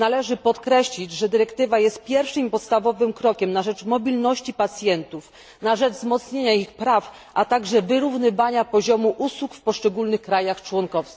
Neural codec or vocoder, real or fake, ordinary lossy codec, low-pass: none; real; none; none